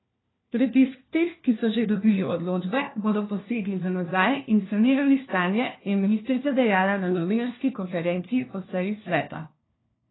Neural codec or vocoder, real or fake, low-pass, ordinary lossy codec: codec, 16 kHz, 1 kbps, FunCodec, trained on LibriTTS, 50 frames a second; fake; 7.2 kHz; AAC, 16 kbps